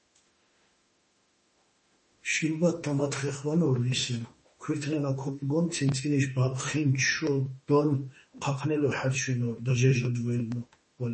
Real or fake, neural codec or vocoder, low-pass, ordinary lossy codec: fake; autoencoder, 48 kHz, 32 numbers a frame, DAC-VAE, trained on Japanese speech; 10.8 kHz; MP3, 32 kbps